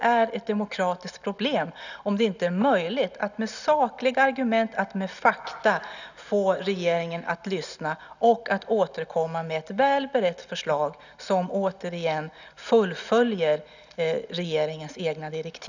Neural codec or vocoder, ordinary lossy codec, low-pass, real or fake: none; none; 7.2 kHz; real